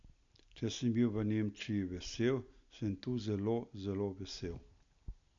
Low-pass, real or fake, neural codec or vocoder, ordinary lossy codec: 7.2 kHz; real; none; MP3, 64 kbps